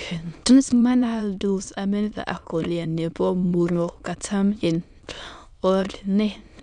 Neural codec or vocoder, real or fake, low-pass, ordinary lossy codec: autoencoder, 22.05 kHz, a latent of 192 numbers a frame, VITS, trained on many speakers; fake; 9.9 kHz; none